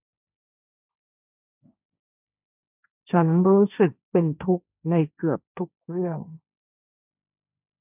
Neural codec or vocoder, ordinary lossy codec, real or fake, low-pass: codec, 16 kHz, 1.1 kbps, Voila-Tokenizer; none; fake; 3.6 kHz